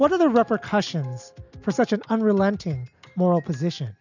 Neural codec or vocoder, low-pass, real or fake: none; 7.2 kHz; real